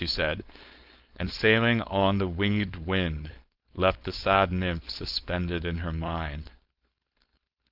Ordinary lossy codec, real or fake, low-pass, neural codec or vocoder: Opus, 32 kbps; fake; 5.4 kHz; codec, 16 kHz, 4.8 kbps, FACodec